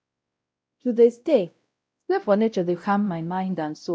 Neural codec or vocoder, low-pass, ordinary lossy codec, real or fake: codec, 16 kHz, 0.5 kbps, X-Codec, WavLM features, trained on Multilingual LibriSpeech; none; none; fake